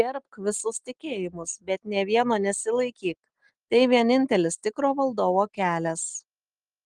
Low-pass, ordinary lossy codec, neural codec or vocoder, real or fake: 10.8 kHz; Opus, 24 kbps; none; real